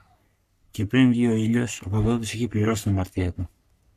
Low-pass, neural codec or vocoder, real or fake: 14.4 kHz; codec, 44.1 kHz, 3.4 kbps, Pupu-Codec; fake